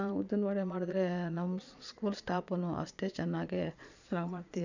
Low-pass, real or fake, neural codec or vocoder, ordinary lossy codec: 7.2 kHz; fake; vocoder, 22.05 kHz, 80 mel bands, Vocos; none